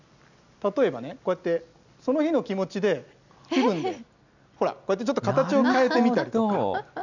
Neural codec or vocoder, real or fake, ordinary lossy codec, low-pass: none; real; none; 7.2 kHz